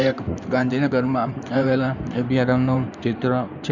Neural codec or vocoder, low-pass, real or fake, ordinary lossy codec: codec, 16 kHz in and 24 kHz out, 2.2 kbps, FireRedTTS-2 codec; 7.2 kHz; fake; none